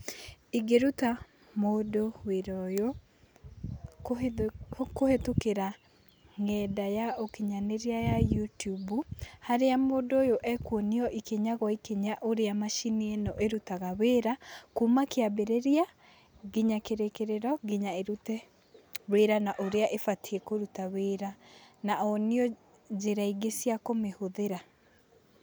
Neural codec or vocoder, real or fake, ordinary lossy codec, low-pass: none; real; none; none